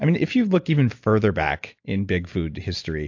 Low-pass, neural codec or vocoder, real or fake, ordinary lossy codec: 7.2 kHz; none; real; AAC, 48 kbps